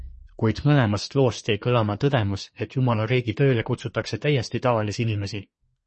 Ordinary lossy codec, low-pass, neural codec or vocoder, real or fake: MP3, 32 kbps; 10.8 kHz; codec, 24 kHz, 1 kbps, SNAC; fake